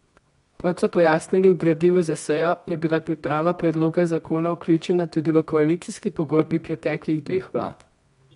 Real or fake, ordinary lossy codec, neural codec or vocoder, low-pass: fake; MP3, 64 kbps; codec, 24 kHz, 0.9 kbps, WavTokenizer, medium music audio release; 10.8 kHz